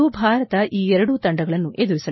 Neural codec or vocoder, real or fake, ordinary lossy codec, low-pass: none; real; MP3, 24 kbps; 7.2 kHz